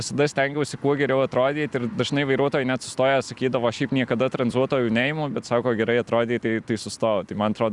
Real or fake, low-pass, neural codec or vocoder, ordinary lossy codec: real; 10.8 kHz; none; Opus, 64 kbps